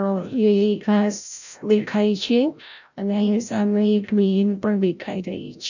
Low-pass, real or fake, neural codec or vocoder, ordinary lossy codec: 7.2 kHz; fake; codec, 16 kHz, 0.5 kbps, FreqCodec, larger model; none